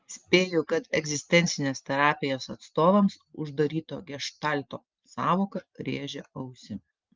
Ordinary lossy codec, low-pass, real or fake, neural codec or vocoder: Opus, 24 kbps; 7.2 kHz; real; none